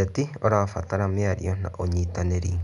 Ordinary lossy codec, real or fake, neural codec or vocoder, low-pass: none; real; none; 10.8 kHz